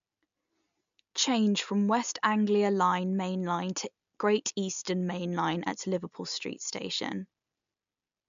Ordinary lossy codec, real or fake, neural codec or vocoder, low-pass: MP3, 64 kbps; real; none; 7.2 kHz